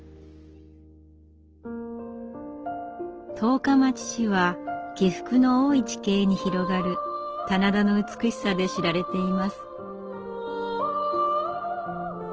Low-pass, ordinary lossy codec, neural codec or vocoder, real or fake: 7.2 kHz; Opus, 16 kbps; none; real